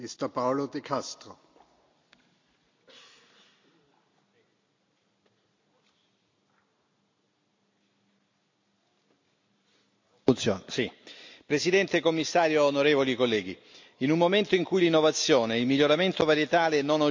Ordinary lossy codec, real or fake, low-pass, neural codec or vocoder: MP3, 64 kbps; real; 7.2 kHz; none